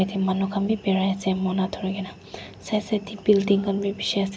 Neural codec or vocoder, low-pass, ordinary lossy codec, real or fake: none; none; none; real